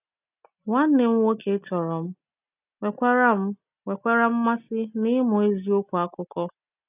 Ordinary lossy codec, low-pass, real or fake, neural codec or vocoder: none; 3.6 kHz; real; none